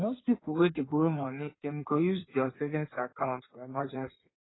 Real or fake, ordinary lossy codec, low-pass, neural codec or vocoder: fake; AAC, 16 kbps; 7.2 kHz; codec, 16 kHz in and 24 kHz out, 1.1 kbps, FireRedTTS-2 codec